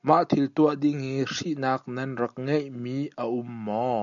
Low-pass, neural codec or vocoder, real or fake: 7.2 kHz; none; real